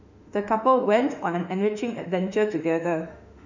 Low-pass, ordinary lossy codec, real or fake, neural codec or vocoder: 7.2 kHz; none; fake; autoencoder, 48 kHz, 32 numbers a frame, DAC-VAE, trained on Japanese speech